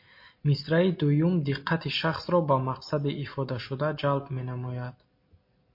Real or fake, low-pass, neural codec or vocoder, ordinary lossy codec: real; 5.4 kHz; none; MP3, 32 kbps